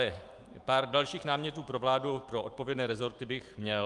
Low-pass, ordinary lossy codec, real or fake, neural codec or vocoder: 10.8 kHz; Opus, 32 kbps; fake; autoencoder, 48 kHz, 128 numbers a frame, DAC-VAE, trained on Japanese speech